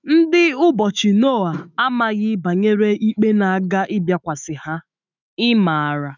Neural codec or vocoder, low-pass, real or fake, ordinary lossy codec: autoencoder, 48 kHz, 128 numbers a frame, DAC-VAE, trained on Japanese speech; 7.2 kHz; fake; none